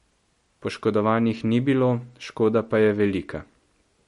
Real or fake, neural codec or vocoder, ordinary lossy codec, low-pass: real; none; MP3, 48 kbps; 19.8 kHz